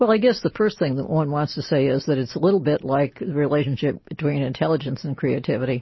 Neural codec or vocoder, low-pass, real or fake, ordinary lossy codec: none; 7.2 kHz; real; MP3, 24 kbps